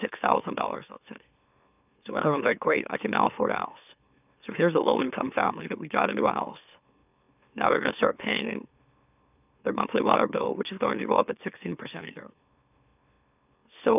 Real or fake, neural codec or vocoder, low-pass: fake; autoencoder, 44.1 kHz, a latent of 192 numbers a frame, MeloTTS; 3.6 kHz